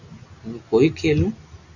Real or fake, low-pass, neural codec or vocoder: real; 7.2 kHz; none